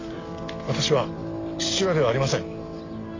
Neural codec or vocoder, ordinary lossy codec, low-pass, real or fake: none; AAC, 32 kbps; 7.2 kHz; real